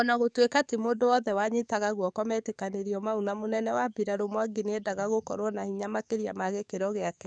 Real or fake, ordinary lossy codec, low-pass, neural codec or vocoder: fake; none; 9.9 kHz; codec, 44.1 kHz, 7.8 kbps, DAC